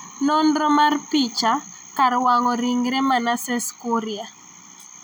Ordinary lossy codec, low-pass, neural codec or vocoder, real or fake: none; none; none; real